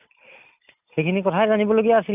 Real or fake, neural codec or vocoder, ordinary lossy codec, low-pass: real; none; none; 3.6 kHz